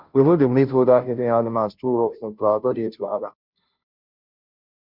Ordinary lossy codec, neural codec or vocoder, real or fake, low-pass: Opus, 64 kbps; codec, 16 kHz, 0.5 kbps, FunCodec, trained on Chinese and English, 25 frames a second; fake; 5.4 kHz